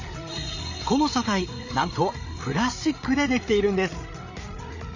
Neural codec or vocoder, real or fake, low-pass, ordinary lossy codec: codec, 16 kHz, 16 kbps, FreqCodec, larger model; fake; 7.2 kHz; Opus, 64 kbps